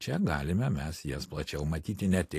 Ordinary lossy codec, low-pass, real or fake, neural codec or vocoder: AAC, 64 kbps; 14.4 kHz; real; none